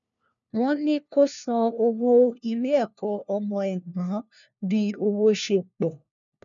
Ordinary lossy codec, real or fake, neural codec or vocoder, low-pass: none; fake; codec, 16 kHz, 1 kbps, FunCodec, trained on LibriTTS, 50 frames a second; 7.2 kHz